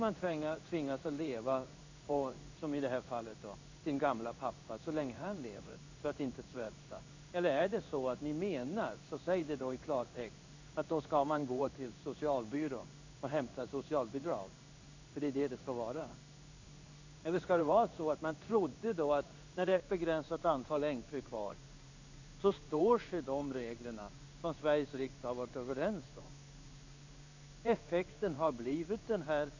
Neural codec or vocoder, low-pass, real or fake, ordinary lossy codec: codec, 16 kHz in and 24 kHz out, 1 kbps, XY-Tokenizer; 7.2 kHz; fake; AAC, 48 kbps